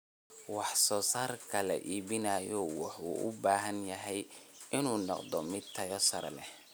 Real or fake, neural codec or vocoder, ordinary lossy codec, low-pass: real; none; none; none